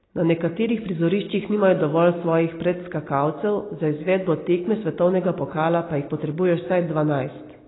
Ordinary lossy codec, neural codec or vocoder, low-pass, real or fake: AAC, 16 kbps; none; 7.2 kHz; real